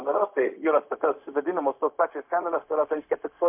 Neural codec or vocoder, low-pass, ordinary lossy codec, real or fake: codec, 16 kHz, 0.4 kbps, LongCat-Audio-Codec; 3.6 kHz; MP3, 32 kbps; fake